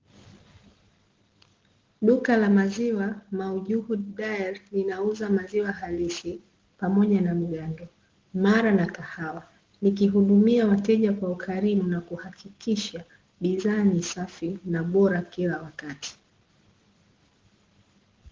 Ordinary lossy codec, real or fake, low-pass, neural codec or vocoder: Opus, 16 kbps; real; 7.2 kHz; none